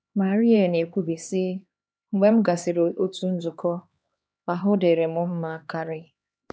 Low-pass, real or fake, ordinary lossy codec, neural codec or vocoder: none; fake; none; codec, 16 kHz, 2 kbps, X-Codec, HuBERT features, trained on LibriSpeech